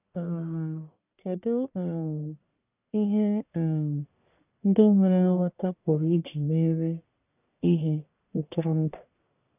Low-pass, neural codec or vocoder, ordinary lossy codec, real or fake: 3.6 kHz; codec, 44.1 kHz, 1.7 kbps, Pupu-Codec; none; fake